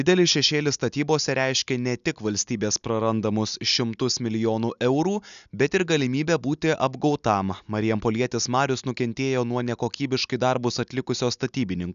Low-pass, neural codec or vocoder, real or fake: 7.2 kHz; none; real